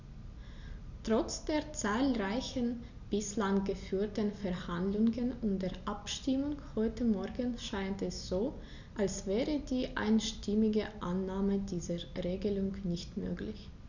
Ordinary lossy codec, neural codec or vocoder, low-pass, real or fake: none; none; 7.2 kHz; real